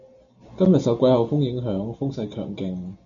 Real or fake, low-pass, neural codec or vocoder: real; 7.2 kHz; none